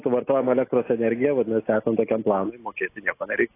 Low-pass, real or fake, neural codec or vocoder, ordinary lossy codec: 3.6 kHz; real; none; AAC, 24 kbps